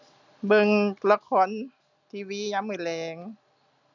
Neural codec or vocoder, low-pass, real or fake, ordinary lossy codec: none; 7.2 kHz; real; none